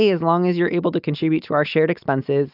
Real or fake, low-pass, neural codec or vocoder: real; 5.4 kHz; none